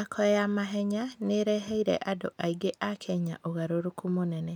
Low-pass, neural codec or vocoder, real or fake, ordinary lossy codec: none; none; real; none